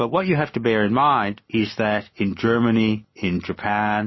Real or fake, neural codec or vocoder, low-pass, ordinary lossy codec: real; none; 7.2 kHz; MP3, 24 kbps